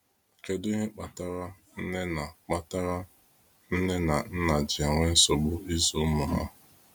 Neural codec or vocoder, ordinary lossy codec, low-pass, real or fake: none; none; none; real